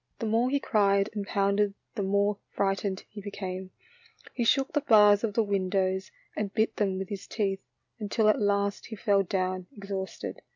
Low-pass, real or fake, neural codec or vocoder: 7.2 kHz; real; none